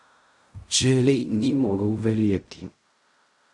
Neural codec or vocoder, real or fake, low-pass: codec, 16 kHz in and 24 kHz out, 0.4 kbps, LongCat-Audio-Codec, fine tuned four codebook decoder; fake; 10.8 kHz